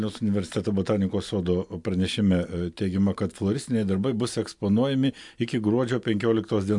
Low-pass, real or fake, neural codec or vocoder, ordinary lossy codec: 10.8 kHz; real; none; MP3, 64 kbps